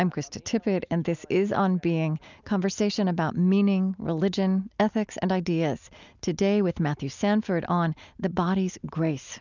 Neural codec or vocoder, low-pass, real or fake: none; 7.2 kHz; real